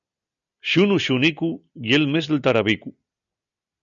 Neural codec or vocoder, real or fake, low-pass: none; real; 7.2 kHz